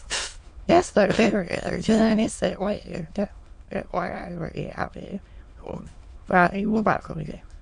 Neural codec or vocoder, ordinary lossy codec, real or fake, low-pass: autoencoder, 22.05 kHz, a latent of 192 numbers a frame, VITS, trained on many speakers; MP3, 64 kbps; fake; 9.9 kHz